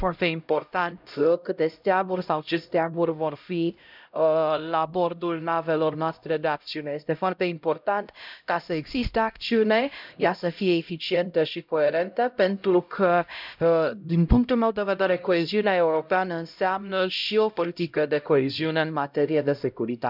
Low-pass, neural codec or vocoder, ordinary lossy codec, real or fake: 5.4 kHz; codec, 16 kHz, 0.5 kbps, X-Codec, HuBERT features, trained on LibriSpeech; none; fake